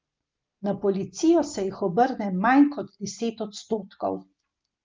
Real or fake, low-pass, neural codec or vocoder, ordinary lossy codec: real; 7.2 kHz; none; Opus, 24 kbps